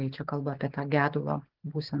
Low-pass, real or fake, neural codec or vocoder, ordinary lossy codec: 5.4 kHz; real; none; Opus, 16 kbps